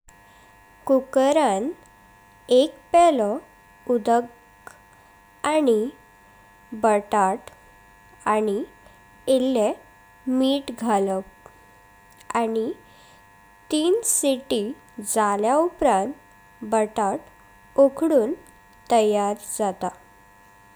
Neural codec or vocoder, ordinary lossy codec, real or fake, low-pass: none; none; real; none